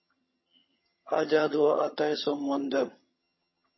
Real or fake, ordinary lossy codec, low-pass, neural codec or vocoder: fake; MP3, 24 kbps; 7.2 kHz; vocoder, 22.05 kHz, 80 mel bands, HiFi-GAN